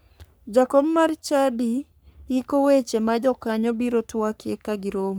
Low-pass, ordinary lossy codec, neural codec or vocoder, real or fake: none; none; codec, 44.1 kHz, 3.4 kbps, Pupu-Codec; fake